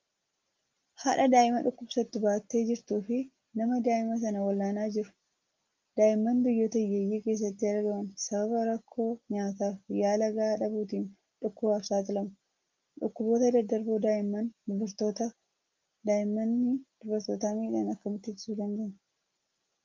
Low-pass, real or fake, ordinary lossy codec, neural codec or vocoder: 7.2 kHz; real; Opus, 32 kbps; none